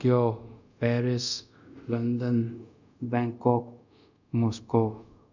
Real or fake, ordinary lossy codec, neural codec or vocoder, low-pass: fake; none; codec, 24 kHz, 0.5 kbps, DualCodec; 7.2 kHz